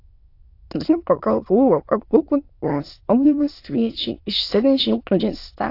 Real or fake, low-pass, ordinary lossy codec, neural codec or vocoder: fake; 5.4 kHz; AAC, 32 kbps; autoencoder, 22.05 kHz, a latent of 192 numbers a frame, VITS, trained on many speakers